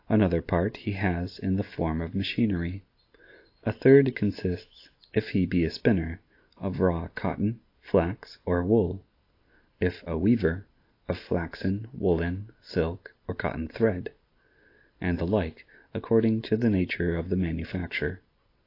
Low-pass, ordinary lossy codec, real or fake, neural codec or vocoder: 5.4 kHz; AAC, 32 kbps; real; none